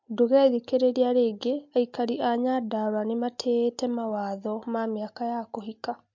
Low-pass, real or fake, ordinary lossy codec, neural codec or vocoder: 7.2 kHz; real; MP3, 48 kbps; none